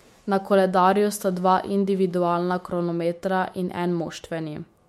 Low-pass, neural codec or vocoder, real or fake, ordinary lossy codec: 19.8 kHz; autoencoder, 48 kHz, 128 numbers a frame, DAC-VAE, trained on Japanese speech; fake; MP3, 64 kbps